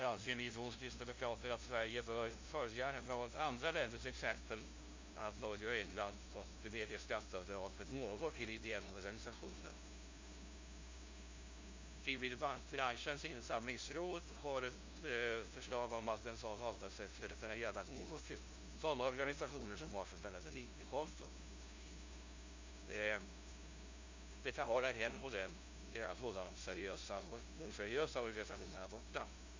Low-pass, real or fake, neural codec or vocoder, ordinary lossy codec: 7.2 kHz; fake; codec, 16 kHz, 0.5 kbps, FunCodec, trained on LibriTTS, 25 frames a second; MP3, 48 kbps